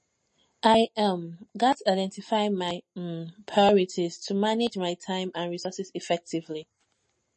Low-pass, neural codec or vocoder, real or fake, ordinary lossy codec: 9.9 kHz; none; real; MP3, 32 kbps